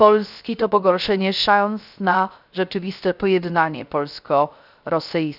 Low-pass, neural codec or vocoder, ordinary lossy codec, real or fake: 5.4 kHz; codec, 16 kHz, 0.3 kbps, FocalCodec; none; fake